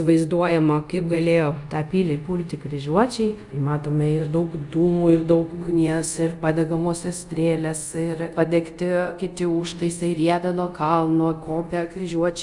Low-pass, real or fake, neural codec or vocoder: 10.8 kHz; fake; codec, 24 kHz, 0.5 kbps, DualCodec